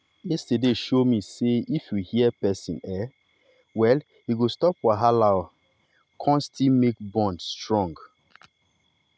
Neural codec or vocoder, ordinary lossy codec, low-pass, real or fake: none; none; none; real